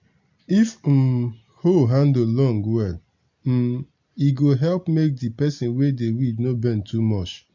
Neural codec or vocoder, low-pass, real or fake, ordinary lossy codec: none; 7.2 kHz; real; MP3, 48 kbps